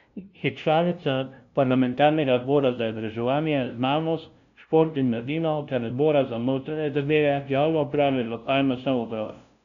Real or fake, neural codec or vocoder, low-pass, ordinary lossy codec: fake; codec, 16 kHz, 0.5 kbps, FunCodec, trained on LibriTTS, 25 frames a second; 7.2 kHz; none